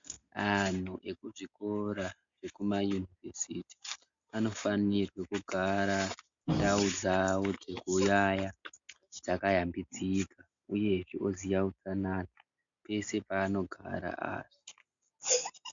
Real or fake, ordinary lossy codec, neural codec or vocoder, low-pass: real; MP3, 64 kbps; none; 7.2 kHz